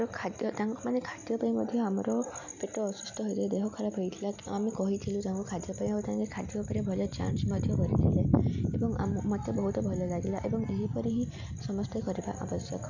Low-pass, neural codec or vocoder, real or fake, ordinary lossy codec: 7.2 kHz; none; real; none